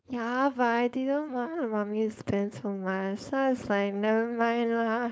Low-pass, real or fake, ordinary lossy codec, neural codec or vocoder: none; fake; none; codec, 16 kHz, 4.8 kbps, FACodec